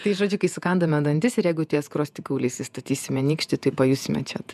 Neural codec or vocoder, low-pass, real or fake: vocoder, 48 kHz, 128 mel bands, Vocos; 14.4 kHz; fake